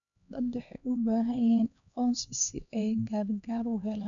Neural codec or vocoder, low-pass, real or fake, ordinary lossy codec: codec, 16 kHz, 2 kbps, X-Codec, HuBERT features, trained on LibriSpeech; 7.2 kHz; fake; none